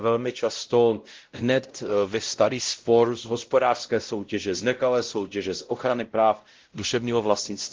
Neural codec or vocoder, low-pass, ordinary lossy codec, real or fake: codec, 16 kHz, 0.5 kbps, X-Codec, WavLM features, trained on Multilingual LibriSpeech; 7.2 kHz; Opus, 16 kbps; fake